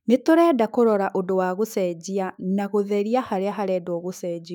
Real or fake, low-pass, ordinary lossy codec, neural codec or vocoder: fake; 19.8 kHz; none; autoencoder, 48 kHz, 128 numbers a frame, DAC-VAE, trained on Japanese speech